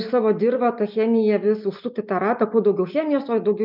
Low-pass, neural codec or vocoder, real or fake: 5.4 kHz; none; real